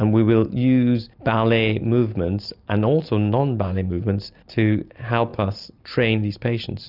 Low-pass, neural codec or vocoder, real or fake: 5.4 kHz; none; real